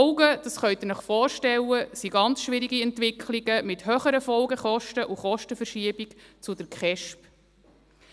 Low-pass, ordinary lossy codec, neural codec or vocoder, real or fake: none; none; none; real